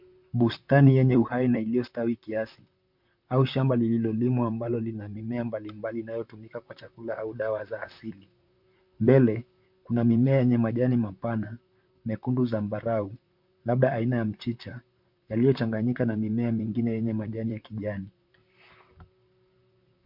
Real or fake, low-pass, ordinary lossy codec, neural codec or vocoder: fake; 5.4 kHz; MP3, 48 kbps; vocoder, 44.1 kHz, 128 mel bands, Pupu-Vocoder